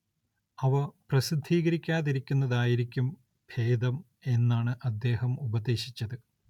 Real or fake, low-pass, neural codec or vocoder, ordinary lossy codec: real; 19.8 kHz; none; none